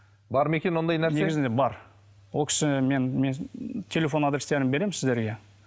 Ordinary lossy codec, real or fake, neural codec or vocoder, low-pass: none; real; none; none